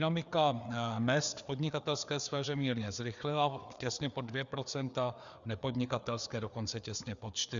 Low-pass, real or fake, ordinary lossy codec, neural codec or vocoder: 7.2 kHz; fake; Opus, 64 kbps; codec, 16 kHz, 4 kbps, FreqCodec, larger model